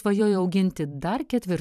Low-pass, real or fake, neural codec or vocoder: 14.4 kHz; fake; vocoder, 44.1 kHz, 128 mel bands every 512 samples, BigVGAN v2